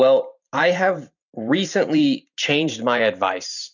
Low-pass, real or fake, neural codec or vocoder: 7.2 kHz; fake; vocoder, 44.1 kHz, 128 mel bands every 512 samples, BigVGAN v2